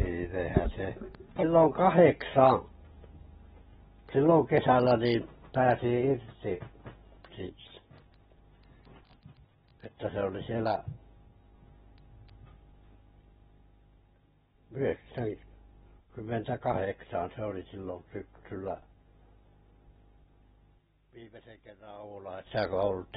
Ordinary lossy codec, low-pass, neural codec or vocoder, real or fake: AAC, 16 kbps; 19.8 kHz; none; real